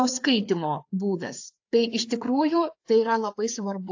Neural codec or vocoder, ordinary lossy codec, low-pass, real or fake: codec, 16 kHz, 4 kbps, FreqCodec, larger model; AAC, 48 kbps; 7.2 kHz; fake